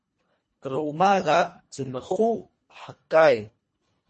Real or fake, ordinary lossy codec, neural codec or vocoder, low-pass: fake; MP3, 32 kbps; codec, 24 kHz, 1.5 kbps, HILCodec; 10.8 kHz